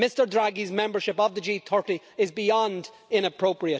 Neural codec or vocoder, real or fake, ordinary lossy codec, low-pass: none; real; none; none